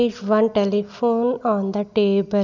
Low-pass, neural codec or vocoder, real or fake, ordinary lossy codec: 7.2 kHz; none; real; none